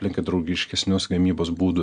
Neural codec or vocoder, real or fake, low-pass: none; real; 9.9 kHz